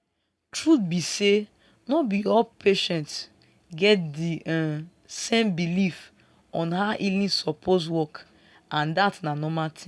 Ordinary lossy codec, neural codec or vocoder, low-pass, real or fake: none; none; none; real